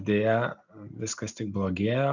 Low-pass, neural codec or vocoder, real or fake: 7.2 kHz; none; real